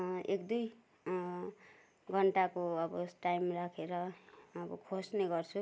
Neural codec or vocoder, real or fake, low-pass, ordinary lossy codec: none; real; none; none